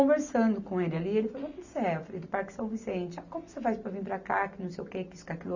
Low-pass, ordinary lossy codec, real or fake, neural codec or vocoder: 7.2 kHz; none; real; none